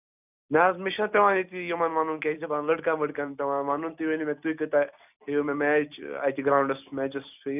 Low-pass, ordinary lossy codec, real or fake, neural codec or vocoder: 3.6 kHz; none; real; none